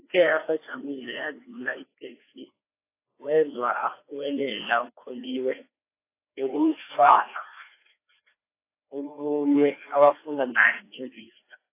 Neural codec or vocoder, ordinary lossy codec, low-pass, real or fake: codec, 16 kHz, 2 kbps, FreqCodec, larger model; AAC, 24 kbps; 3.6 kHz; fake